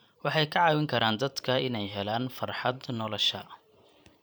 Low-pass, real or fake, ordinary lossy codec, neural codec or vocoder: none; fake; none; vocoder, 44.1 kHz, 128 mel bands every 512 samples, BigVGAN v2